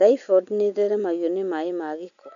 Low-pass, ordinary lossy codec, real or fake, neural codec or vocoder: 7.2 kHz; none; real; none